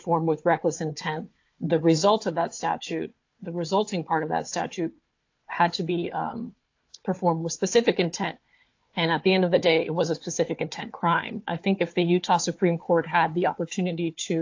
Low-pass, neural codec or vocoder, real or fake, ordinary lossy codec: 7.2 kHz; codec, 16 kHz, 4 kbps, FunCodec, trained on Chinese and English, 50 frames a second; fake; AAC, 48 kbps